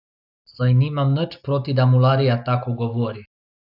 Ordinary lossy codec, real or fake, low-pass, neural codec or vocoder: AAC, 48 kbps; real; 5.4 kHz; none